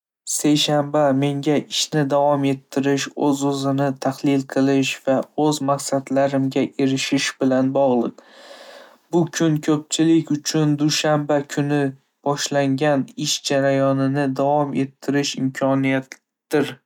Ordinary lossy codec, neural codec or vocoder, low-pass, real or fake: none; none; 19.8 kHz; real